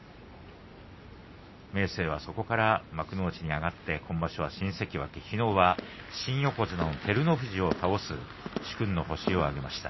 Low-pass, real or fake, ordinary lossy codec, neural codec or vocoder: 7.2 kHz; real; MP3, 24 kbps; none